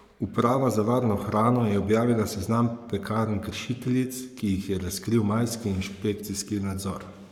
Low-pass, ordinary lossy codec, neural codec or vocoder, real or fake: 19.8 kHz; none; codec, 44.1 kHz, 7.8 kbps, Pupu-Codec; fake